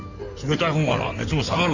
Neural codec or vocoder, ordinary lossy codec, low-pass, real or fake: codec, 16 kHz in and 24 kHz out, 2.2 kbps, FireRedTTS-2 codec; none; 7.2 kHz; fake